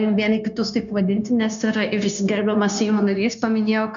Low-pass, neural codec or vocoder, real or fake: 7.2 kHz; codec, 16 kHz, 0.9 kbps, LongCat-Audio-Codec; fake